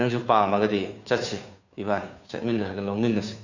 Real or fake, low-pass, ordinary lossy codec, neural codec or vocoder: fake; 7.2 kHz; none; codec, 16 kHz, 2 kbps, FunCodec, trained on Chinese and English, 25 frames a second